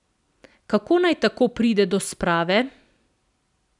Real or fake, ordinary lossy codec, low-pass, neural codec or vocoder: real; none; 10.8 kHz; none